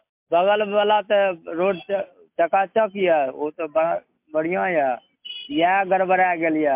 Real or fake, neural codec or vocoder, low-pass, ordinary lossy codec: real; none; 3.6 kHz; MP3, 32 kbps